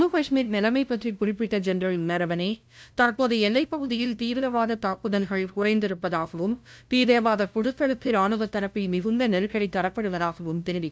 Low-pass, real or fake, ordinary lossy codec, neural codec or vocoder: none; fake; none; codec, 16 kHz, 0.5 kbps, FunCodec, trained on LibriTTS, 25 frames a second